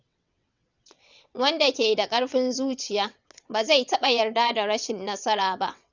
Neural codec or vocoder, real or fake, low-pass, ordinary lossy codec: vocoder, 22.05 kHz, 80 mel bands, WaveNeXt; fake; 7.2 kHz; none